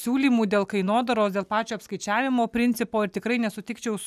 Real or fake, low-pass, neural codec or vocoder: real; 14.4 kHz; none